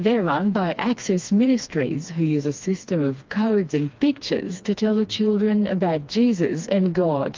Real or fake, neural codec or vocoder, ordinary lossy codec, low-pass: fake; codec, 16 kHz, 2 kbps, FreqCodec, smaller model; Opus, 32 kbps; 7.2 kHz